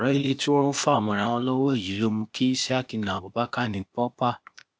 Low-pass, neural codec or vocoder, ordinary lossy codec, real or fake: none; codec, 16 kHz, 0.8 kbps, ZipCodec; none; fake